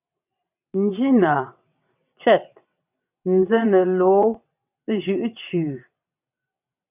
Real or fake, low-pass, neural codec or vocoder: fake; 3.6 kHz; vocoder, 22.05 kHz, 80 mel bands, WaveNeXt